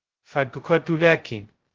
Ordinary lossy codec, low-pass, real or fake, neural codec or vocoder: Opus, 16 kbps; 7.2 kHz; fake; codec, 16 kHz, 0.2 kbps, FocalCodec